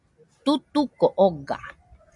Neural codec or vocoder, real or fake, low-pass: none; real; 10.8 kHz